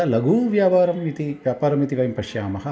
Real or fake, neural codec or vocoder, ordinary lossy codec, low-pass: real; none; none; none